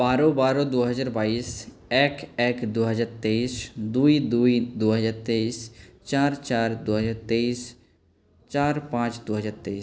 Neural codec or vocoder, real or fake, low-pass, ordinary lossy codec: none; real; none; none